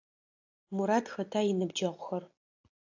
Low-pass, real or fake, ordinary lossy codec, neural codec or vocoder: 7.2 kHz; real; AAC, 48 kbps; none